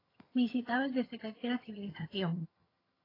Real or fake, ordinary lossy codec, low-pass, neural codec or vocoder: fake; AAC, 24 kbps; 5.4 kHz; vocoder, 22.05 kHz, 80 mel bands, HiFi-GAN